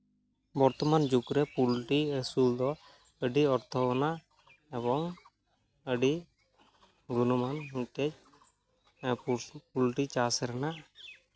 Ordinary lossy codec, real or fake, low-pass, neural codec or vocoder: none; real; none; none